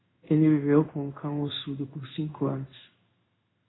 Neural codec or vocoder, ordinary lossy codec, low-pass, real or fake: codec, 16 kHz in and 24 kHz out, 1 kbps, XY-Tokenizer; AAC, 16 kbps; 7.2 kHz; fake